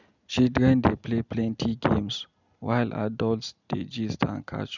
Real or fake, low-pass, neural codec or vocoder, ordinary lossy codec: real; 7.2 kHz; none; none